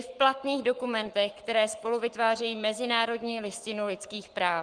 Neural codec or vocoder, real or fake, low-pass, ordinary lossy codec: codec, 44.1 kHz, 7.8 kbps, Pupu-Codec; fake; 9.9 kHz; Opus, 24 kbps